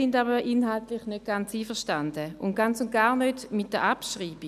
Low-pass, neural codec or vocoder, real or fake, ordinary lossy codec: 14.4 kHz; none; real; none